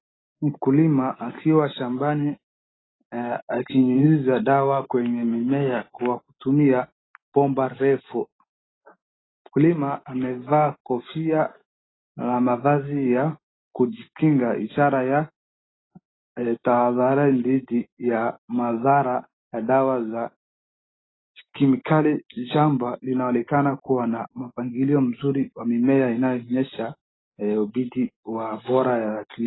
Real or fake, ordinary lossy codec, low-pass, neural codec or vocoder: real; AAC, 16 kbps; 7.2 kHz; none